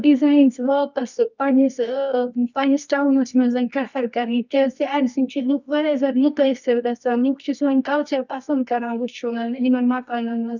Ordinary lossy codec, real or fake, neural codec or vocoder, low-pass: none; fake; codec, 24 kHz, 0.9 kbps, WavTokenizer, medium music audio release; 7.2 kHz